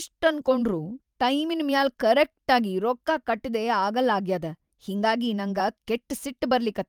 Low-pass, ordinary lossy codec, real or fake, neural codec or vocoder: 19.8 kHz; Opus, 32 kbps; fake; vocoder, 44.1 kHz, 128 mel bands every 512 samples, BigVGAN v2